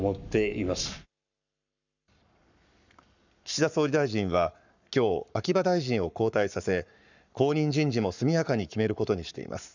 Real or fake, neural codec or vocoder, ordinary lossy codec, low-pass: fake; codec, 44.1 kHz, 7.8 kbps, Pupu-Codec; none; 7.2 kHz